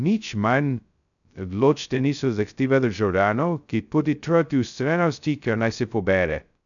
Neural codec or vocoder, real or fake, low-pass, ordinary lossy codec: codec, 16 kHz, 0.2 kbps, FocalCodec; fake; 7.2 kHz; none